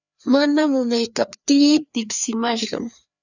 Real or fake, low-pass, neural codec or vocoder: fake; 7.2 kHz; codec, 16 kHz, 2 kbps, FreqCodec, larger model